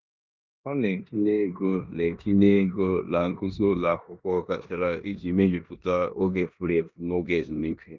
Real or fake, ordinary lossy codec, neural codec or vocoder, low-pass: fake; Opus, 24 kbps; codec, 16 kHz in and 24 kHz out, 0.9 kbps, LongCat-Audio-Codec, four codebook decoder; 7.2 kHz